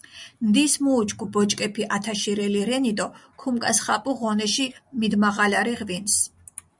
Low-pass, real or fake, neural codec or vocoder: 10.8 kHz; real; none